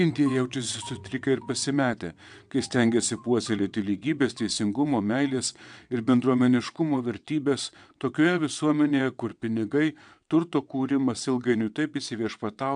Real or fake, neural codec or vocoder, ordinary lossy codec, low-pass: fake; vocoder, 22.05 kHz, 80 mel bands, WaveNeXt; MP3, 96 kbps; 9.9 kHz